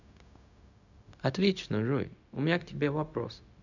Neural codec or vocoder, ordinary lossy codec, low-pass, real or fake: codec, 16 kHz, 0.4 kbps, LongCat-Audio-Codec; none; 7.2 kHz; fake